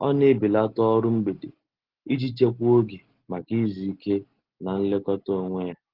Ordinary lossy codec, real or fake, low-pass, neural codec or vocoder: Opus, 16 kbps; real; 5.4 kHz; none